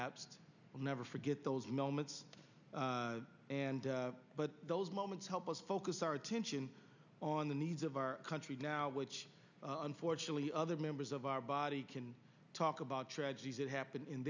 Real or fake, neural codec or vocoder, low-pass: real; none; 7.2 kHz